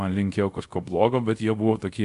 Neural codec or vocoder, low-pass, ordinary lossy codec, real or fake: codec, 24 kHz, 0.5 kbps, DualCodec; 10.8 kHz; AAC, 64 kbps; fake